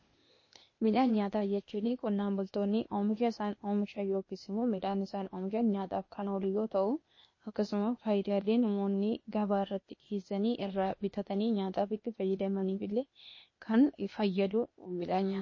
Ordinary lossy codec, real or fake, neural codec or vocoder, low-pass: MP3, 32 kbps; fake; codec, 16 kHz, 0.8 kbps, ZipCodec; 7.2 kHz